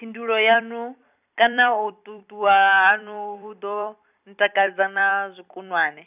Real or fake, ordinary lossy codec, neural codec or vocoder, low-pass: fake; none; vocoder, 44.1 kHz, 128 mel bands every 256 samples, BigVGAN v2; 3.6 kHz